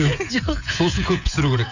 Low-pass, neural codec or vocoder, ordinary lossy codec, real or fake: 7.2 kHz; codec, 16 kHz, 16 kbps, FreqCodec, smaller model; none; fake